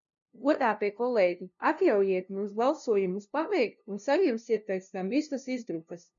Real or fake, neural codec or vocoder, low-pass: fake; codec, 16 kHz, 0.5 kbps, FunCodec, trained on LibriTTS, 25 frames a second; 7.2 kHz